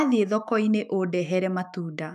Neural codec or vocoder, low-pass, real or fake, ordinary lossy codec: autoencoder, 48 kHz, 128 numbers a frame, DAC-VAE, trained on Japanese speech; 14.4 kHz; fake; none